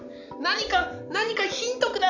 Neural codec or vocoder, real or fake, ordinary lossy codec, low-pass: none; real; none; 7.2 kHz